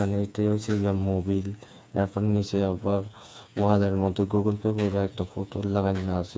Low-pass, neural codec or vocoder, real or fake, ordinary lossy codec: none; codec, 16 kHz, 4 kbps, FreqCodec, smaller model; fake; none